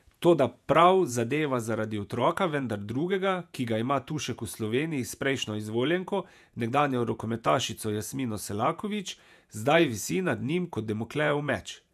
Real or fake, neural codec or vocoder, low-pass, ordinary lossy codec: fake; vocoder, 48 kHz, 128 mel bands, Vocos; 14.4 kHz; none